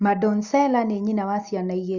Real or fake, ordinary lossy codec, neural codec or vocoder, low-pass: real; Opus, 64 kbps; none; 7.2 kHz